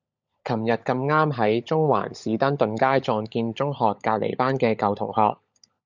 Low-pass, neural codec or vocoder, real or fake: 7.2 kHz; codec, 16 kHz, 16 kbps, FunCodec, trained on LibriTTS, 50 frames a second; fake